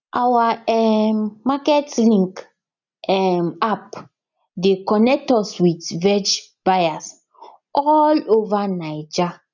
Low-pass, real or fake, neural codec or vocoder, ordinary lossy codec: 7.2 kHz; real; none; none